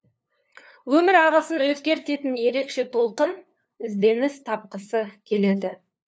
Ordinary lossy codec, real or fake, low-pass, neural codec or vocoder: none; fake; none; codec, 16 kHz, 2 kbps, FunCodec, trained on LibriTTS, 25 frames a second